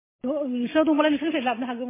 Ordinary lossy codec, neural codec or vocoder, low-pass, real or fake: MP3, 16 kbps; none; 3.6 kHz; real